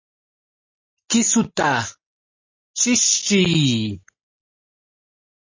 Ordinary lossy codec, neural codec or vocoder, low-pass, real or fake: MP3, 32 kbps; none; 7.2 kHz; real